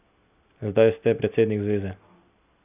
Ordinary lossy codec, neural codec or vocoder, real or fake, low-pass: none; none; real; 3.6 kHz